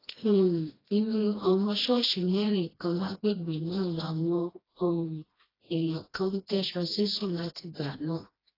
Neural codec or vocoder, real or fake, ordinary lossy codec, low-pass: codec, 16 kHz, 1 kbps, FreqCodec, smaller model; fake; AAC, 24 kbps; 5.4 kHz